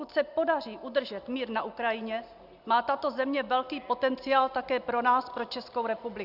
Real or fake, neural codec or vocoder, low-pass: real; none; 5.4 kHz